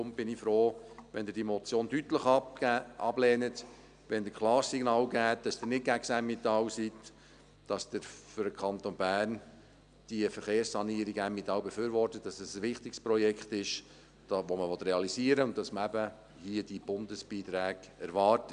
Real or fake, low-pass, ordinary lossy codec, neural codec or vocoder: real; 9.9 kHz; none; none